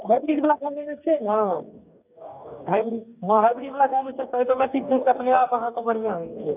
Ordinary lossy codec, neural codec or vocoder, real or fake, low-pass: none; codec, 44.1 kHz, 2.6 kbps, DAC; fake; 3.6 kHz